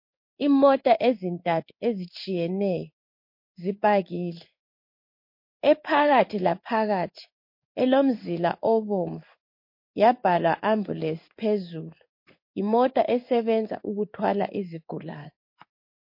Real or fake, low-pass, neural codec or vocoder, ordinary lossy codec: fake; 5.4 kHz; codec, 16 kHz in and 24 kHz out, 1 kbps, XY-Tokenizer; MP3, 32 kbps